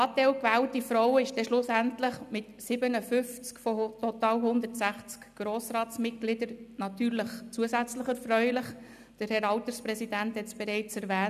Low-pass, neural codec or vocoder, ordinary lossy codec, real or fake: 14.4 kHz; none; none; real